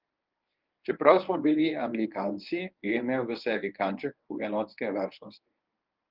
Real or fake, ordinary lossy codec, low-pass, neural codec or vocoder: fake; Opus, 24 kbps; 5.4 kHz; codec, 24 kHz, 0.9 kbps, WavTokenizer, medium speech release version 1